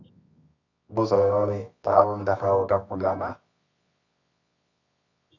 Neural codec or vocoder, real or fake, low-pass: codec, 24 kHz, 0.9 kbps, WavTokenizer, medium music audio release; fake; 7.2 kHz